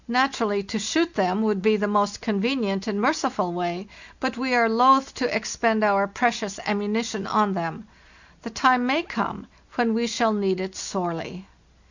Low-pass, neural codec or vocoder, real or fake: 7.2 kHz; none; real